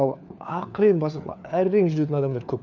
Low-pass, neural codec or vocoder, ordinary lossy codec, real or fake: 7.2 kHz; codec, 16 kHz, 4 kbps, FunCodec, trained on LibriTTS, 50 frames a second; none; fake